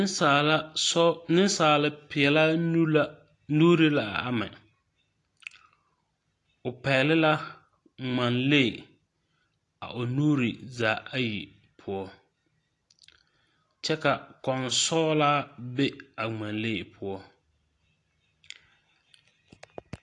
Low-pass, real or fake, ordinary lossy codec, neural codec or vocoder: 10.8 kHz; real; AAC, 48 kbps; none